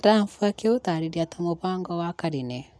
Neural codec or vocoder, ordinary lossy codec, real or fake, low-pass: none; none; real; none